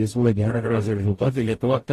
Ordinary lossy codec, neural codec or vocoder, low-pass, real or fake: AAC, 48 kbps; codec, 44.1 kHz, 0.9 kbps, DAC; 14.4 kHz; fake